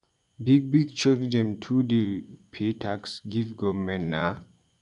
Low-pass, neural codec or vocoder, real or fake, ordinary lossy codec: 10.8 kHz; vocoder, 24 kHz, 100 mel bands, Vocos; fake; none